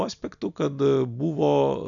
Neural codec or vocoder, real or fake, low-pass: none; real; 7.2 kHz